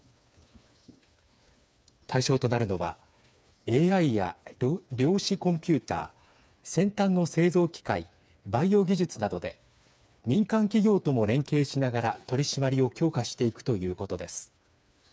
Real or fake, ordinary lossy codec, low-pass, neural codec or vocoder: fake; none; none; codec, 16 kHz, 4 kbps, FreqCodec, smaller model